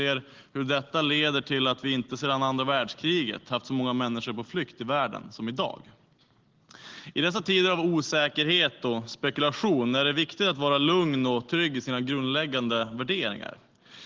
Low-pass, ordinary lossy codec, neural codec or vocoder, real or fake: 7.2 kHz; Opus, 16 kbps; none; real